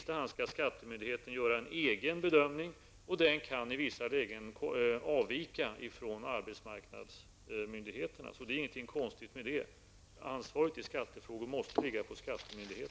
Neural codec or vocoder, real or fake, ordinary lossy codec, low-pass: none; real; none; none